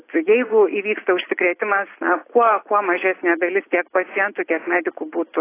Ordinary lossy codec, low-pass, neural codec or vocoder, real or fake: AAC, 24 kbps; 3.6 kHz; none; real